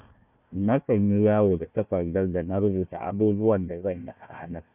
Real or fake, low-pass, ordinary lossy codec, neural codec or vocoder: fake; 3.6 kHz; none; codec, 16 kHz, 1 kbps, FunCodec, trained on Chinese and English, 50 frames a second